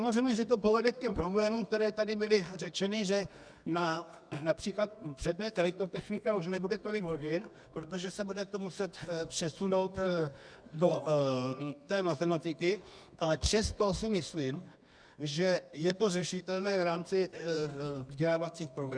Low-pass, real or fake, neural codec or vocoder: 9.9 kHz; fake; codec, 24 kHz, 0.9 kbps, WavTokenizer, medium music audio release